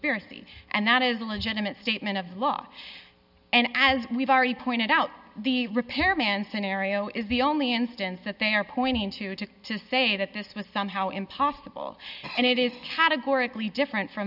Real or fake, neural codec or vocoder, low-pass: real; none; 5.4 kHz